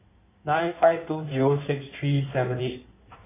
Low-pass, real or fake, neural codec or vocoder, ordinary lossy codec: 3.6 kHz; fake; codec, 16 kHz in and 24 kHz out, 1.1 kbps, FireRedTTS-2 codec; AAC, 24 kbps